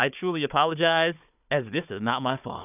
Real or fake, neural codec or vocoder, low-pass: fake; codec, 16 kHz, 4 kbps, FunCodec, trained on Chinese and English, 50 frames a second; 3.6 kHz